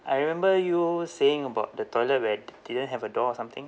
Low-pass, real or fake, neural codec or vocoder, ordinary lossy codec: none; real; none; none